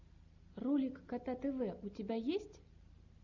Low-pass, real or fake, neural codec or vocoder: 7.2 kHz; real; none